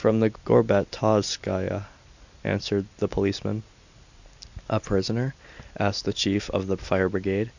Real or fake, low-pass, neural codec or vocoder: real; 7.2 kHz; none